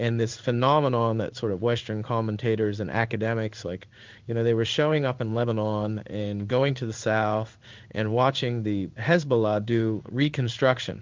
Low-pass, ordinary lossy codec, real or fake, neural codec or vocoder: 7.2 kHz; Opus, 24 kbps; fake; codec, 16 kHz in and 24 kHz out, 1 kbps, XY-Tokenizer